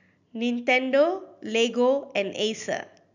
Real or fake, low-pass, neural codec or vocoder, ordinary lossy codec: real; 7.2 kHz; none; none